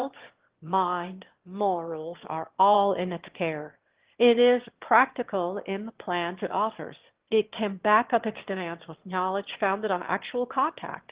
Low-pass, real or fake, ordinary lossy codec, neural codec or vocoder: 3.6 kHz; fake; Opus, 16 kbps; autoencoder, 22.05 kHz, a latent of 192 numbers a frame, VITS, trained on one speaker